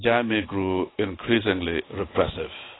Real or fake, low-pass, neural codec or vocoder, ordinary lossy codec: real; 7.2 kHz; none; AAC, 16 kbps